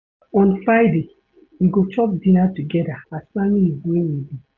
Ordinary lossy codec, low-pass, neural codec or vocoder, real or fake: none; 7.2 kHz; none; real